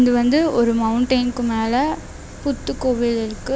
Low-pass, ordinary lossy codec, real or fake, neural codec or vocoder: none; none; real; none